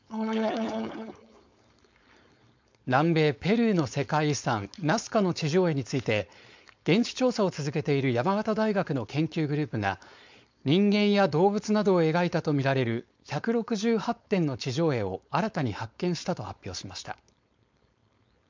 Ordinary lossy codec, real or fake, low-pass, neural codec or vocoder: MP3, 64 kbps; fake; 7.2 kHz; codec, 16 kHz, 4.8 kbps, FACodec